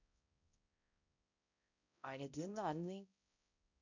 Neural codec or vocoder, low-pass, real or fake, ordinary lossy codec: codec, 16 kHz, 0.5 kbps, X-Codec, HuBERT features, trained on balanced general audio; 7.2 kHz; fake; none